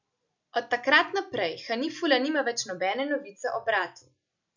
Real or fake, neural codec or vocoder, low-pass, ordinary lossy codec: real; none; 7.2 kHz; none